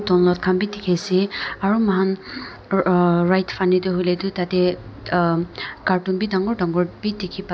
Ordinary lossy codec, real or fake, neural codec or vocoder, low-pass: none; real; none; none